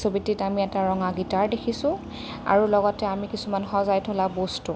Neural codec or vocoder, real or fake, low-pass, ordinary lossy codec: none; real; none; none